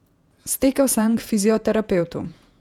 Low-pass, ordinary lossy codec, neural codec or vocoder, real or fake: 19.8 kHz; none; vocoder, 48 kHz, 128 mel bands, Vocos; fake